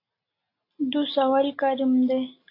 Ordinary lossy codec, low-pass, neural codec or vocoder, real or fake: AAC, 32 kbps; 5.4 kHz; none; real